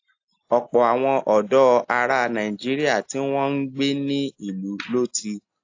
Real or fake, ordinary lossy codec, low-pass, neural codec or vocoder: real; AAC, 48 kbps; 7.2 kHz; none